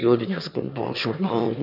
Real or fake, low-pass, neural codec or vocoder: fake; 5.4 kHz; autoencoder, 22.05 kHz, a latent of 192 numbers a frame, VITS, trained on one speaker